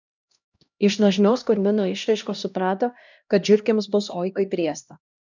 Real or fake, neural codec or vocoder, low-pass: fake; codec, 16 kHz, 1 kbps, X-Codec, HuBERT features, trained on LibriSpeech; 7.2 kHz